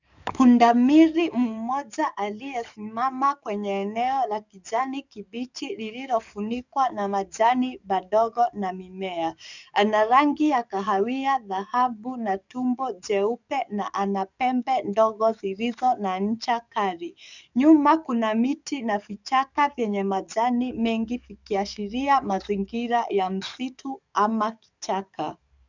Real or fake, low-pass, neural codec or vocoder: fake; 7.2 kHz; codec, 44.1 kHz, 7.8 kbps, DAC